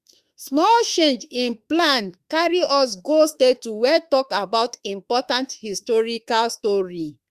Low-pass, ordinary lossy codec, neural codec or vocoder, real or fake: 14.4 kHz; Opus, 64 kbps; autoencoder, 48 kHz, 32 numbers a frame, DAC-VAE, trained on Japanese speech; fake